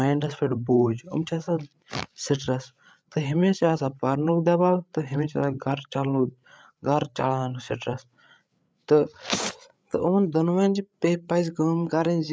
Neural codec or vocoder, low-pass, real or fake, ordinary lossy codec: codec, 16 kHz, 8 kbps, FreqCodec, larger model; none; fake; none